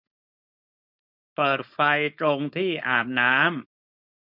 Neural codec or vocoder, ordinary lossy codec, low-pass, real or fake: codec, 16 kHz, 4.8 kbps, FACodec; none; 5.4 kHz; fake